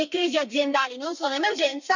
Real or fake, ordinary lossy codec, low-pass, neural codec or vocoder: fake; none; 7.2 kHz; codec, 32 kHz, 1.9 kbps, SNAC